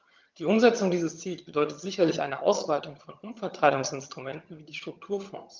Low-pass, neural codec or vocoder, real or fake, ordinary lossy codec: 7.2 kHz; vocoder, 22.05 kHz, 80 mel bands, HiFi-GAN; fake; Opus, 24 kbps